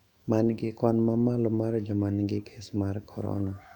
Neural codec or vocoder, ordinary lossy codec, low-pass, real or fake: autoencoder, 48 kHz, 128 numbers a frame, DAC-VAE, trained on Japanese speech; MP3, 96 kbps; 19.8 kHz; fake